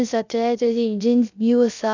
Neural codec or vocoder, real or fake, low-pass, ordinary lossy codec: codec, 16 kHz, 0.3 kbps, FocalCodec; fake; 7.2 kHz; none